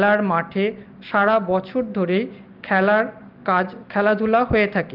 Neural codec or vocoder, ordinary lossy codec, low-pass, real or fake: none; Opus, 32 kbps; 5.4 kHz; real